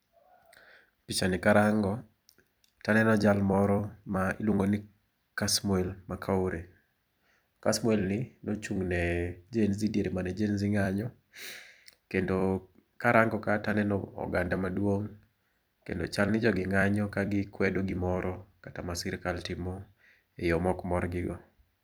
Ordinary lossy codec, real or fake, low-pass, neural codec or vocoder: none; fake; none; vocoder, 44.1 kHz, 128 mel bands every 256 samples, BigVGAN v2